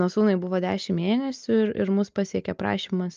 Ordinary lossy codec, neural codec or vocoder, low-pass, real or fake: Opus, 24 kbps; none; 7.2 kHz; real